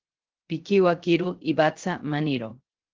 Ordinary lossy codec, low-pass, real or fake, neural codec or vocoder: Opus, 16 kbps; 7.2 kHz; fake; codec, 16 kHz, 0.3 kbps, FocalCodec